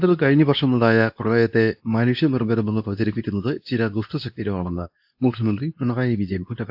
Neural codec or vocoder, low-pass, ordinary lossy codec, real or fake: codec, 24 kHz, 0.9 kbps, WavTokenizer, medium speech release version 2; 5.4 kHz; AAC, 48 kbps; fake